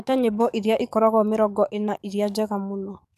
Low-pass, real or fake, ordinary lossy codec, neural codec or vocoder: 14.4 kHz; fake; none; codec, 44.1 kHz, 7.8 kbps, DAC